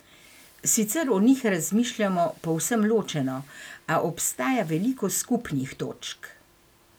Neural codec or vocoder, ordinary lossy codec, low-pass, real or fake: none; none; none; real